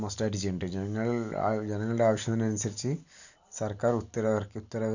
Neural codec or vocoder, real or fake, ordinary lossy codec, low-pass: none; real; none; 7.2 kHz